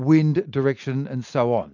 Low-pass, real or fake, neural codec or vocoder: 7.2 kHz; real; none